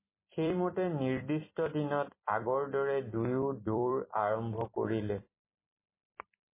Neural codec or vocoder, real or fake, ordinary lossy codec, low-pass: vocoder, 44.1 kHz, 128 mel bands every 256 samples, BigVGAN v2; fake; MP3, 16 kbps; 3.6 kHz